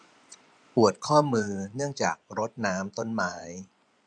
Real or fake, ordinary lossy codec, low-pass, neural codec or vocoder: fake; none; 9.9 kHz; vocoder, 24 kHz, 100 mel bands, Vocos